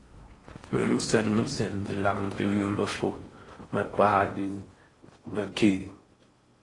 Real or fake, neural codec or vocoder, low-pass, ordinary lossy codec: fake; codec, 16 kHz in and 24 kHz out, 0.6 kbps, FocalCodec, streaming, 4096 codes; 10.8 kHz; AAC, 32 kbps